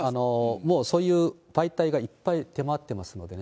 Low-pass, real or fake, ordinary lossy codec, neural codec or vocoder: none; real; none; none